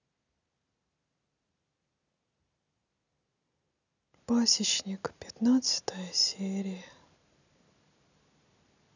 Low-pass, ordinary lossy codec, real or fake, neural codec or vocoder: 7.2 kHz; none; real; none